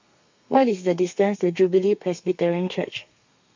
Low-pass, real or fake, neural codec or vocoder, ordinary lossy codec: 7.2 kHz; fake; codec, 32 kHz, 1.9 kbps, SNAC; MP3, 48 kbps